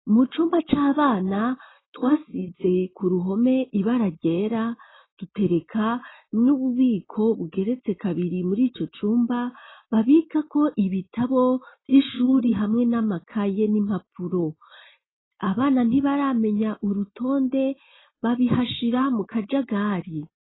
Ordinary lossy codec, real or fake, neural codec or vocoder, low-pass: AAC, 16 kbps; real; none; 7.2 kHz